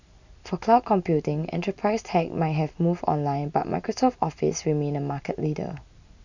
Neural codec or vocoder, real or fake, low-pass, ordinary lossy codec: none; real; 7.2 kHz; none